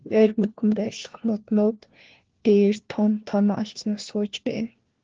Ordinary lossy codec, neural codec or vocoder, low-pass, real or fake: Opus, 16 kbps; codec, 16 kHz, 1 kbps, FunCodec, trained on LibriTTS, 50 frames a second; 7.2 kHz; fake